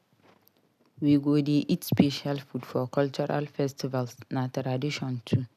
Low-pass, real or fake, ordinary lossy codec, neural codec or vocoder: 14.4 kHz; real; none; none